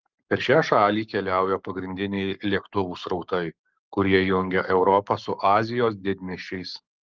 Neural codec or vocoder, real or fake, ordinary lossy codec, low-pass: codec, 44.1 kHz, 7.8 kbps, Pupu-Codec; fake; Opus, 32 kbps; 7.2 kHz